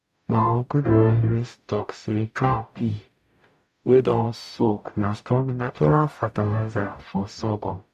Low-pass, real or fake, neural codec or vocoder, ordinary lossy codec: 14.4 kHz; fake; codec, 44.1 kHz, 0.9 kbps, DAC; none